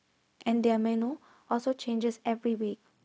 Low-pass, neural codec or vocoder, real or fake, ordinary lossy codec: none; codec, 16 kHz, 0.4 kbps, LongCat-Audio-Codec; fake; none